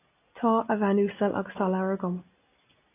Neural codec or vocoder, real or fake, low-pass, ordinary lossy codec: none; real; 3.6 kHz; AAC, 24 kbps